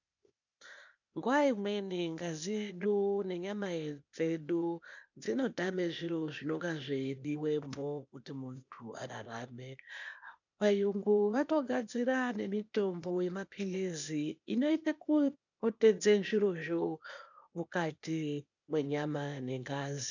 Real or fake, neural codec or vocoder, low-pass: fake; codec, 16 kHz, 0.8 kbps, ZipCodec; 7.2 kHz